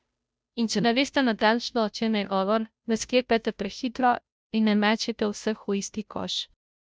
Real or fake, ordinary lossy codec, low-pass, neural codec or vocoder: fake; none; none; codec, 16 kHz, 0.5 kbps, FunCodec, trained on Chinese and English, 25 frames a second